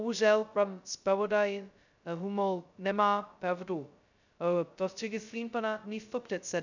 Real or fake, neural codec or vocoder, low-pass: fake; codec, 16 kHz, 0.2 kbps, FocalCodec; 7.2 kHz